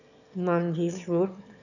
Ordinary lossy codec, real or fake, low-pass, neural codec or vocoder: none; fake; 7.2 kHz; autoencoder, 22.05 kHz, a latent of 192 numbers a frame, VITS, trained on one speaker